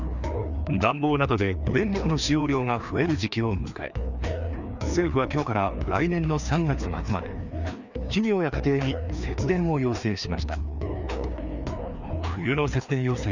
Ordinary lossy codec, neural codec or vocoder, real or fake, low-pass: none; codec, 16 kHz, 2 kbps, FreqCodec, larger model; fake; 7.2 kHz